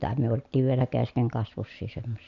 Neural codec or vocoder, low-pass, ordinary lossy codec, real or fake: none; 7.2 kHz; none; real